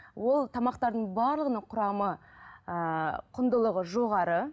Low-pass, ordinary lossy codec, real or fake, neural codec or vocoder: none; none; real; none